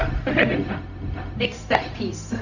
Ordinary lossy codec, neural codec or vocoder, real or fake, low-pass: none; codec, 16 kHz, 0.4 kbps, LongCat-Audio-Codec; fake; 7.2 kHz